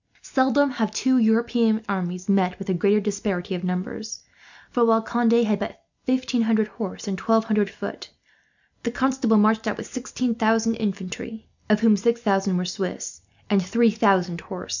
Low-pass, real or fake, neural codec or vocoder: 7.2 kHz; real; none